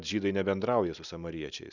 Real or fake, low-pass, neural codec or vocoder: real; 7.2 kHz; none